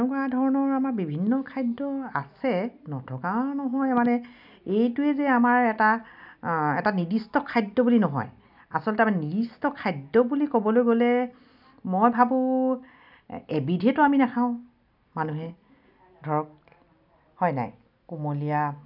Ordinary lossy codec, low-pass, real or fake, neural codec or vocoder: none; 5.4 kHz; real; none